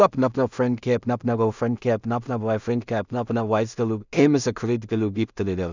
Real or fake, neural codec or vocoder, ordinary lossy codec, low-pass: fake; codec, 16 kHz in and 24 kHz out, 0.4 kbps, LongCat-Audio-Codec, two codebook decoder; none; 7.2 kHz